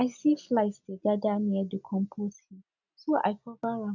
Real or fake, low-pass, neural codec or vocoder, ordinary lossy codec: real; 7.2 kHz; none; none